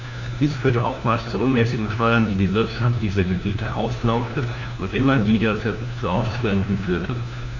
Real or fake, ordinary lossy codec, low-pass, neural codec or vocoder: fake; none; 7.2 kHz; codec, 16 kHz, 1 kbps, FunCodec, trained on LibriTTS, 50 frames a second